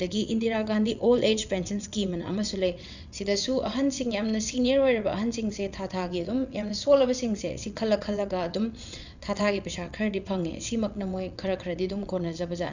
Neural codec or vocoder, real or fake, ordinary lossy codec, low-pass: vocoder, 22.05 kHz, 80 mel bands, WaveNeXt; fake; none; 7.2 kHz